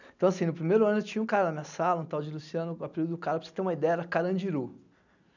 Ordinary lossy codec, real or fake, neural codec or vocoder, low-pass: none; real; none; 7.2 kHz